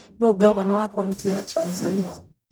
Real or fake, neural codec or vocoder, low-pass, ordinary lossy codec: fake; codec, 44.1 kHz, 0.9 kbps, DAC; none; none